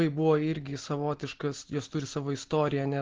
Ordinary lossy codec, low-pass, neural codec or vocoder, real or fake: Opus, 16 kbps; 7.2 kHz; none; real